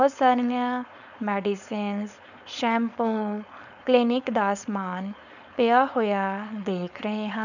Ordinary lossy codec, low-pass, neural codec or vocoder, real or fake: none; 7.2 kHz; codec, 16 kHz, 4.8 kbps, FACodec; fake